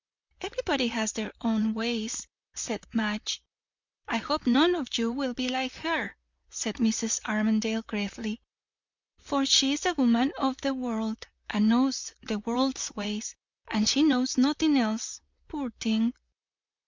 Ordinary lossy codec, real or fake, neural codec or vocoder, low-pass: MP3, 64 kbps; fake; vocoder, 44.1 kHz, 128 mel bands, Pupu-Vocoder; 7.2 kHz